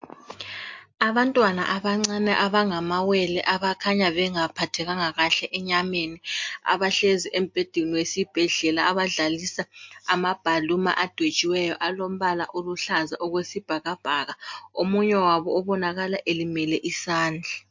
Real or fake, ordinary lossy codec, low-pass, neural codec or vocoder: real; MP3, 48 kbps; 7.2 kHz; none